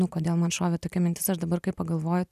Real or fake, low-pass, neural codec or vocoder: real; 14.4 kHz; none